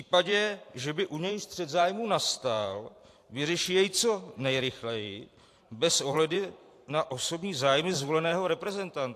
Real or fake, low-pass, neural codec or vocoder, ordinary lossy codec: fake; 14.4 kHz; vocoder, 44.1 kHz, 128 mel bands every 512 samples, BigVGAN v2; AAC, 64 kbps